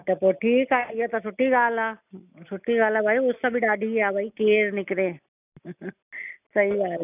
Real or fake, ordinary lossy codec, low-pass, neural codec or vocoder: real; none; 3.6 kHz; none